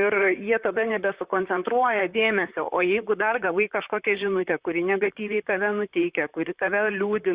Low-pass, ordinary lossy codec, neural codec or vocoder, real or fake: 3.6 kHz; Opus, 64 kbps; vocoder, 44.1 kHz, 128 mel bands, Pupu-Vocoder; fake